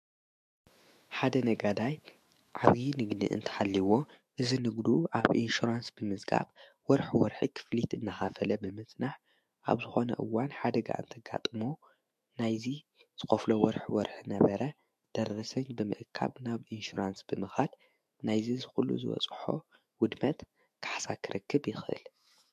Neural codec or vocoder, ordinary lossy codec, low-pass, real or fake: autoencoder, 48 kHz, 128 numbers a frame, DAC-VAE, trained on Japanese speech; MP3, 64 kbps; 14.4 kHz; fake